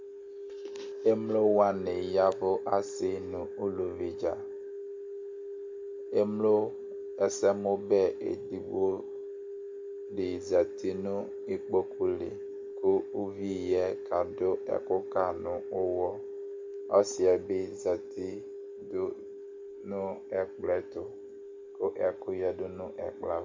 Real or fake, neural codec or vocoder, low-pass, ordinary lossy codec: real; none; 7.2 kHz; MP3, 48 kbps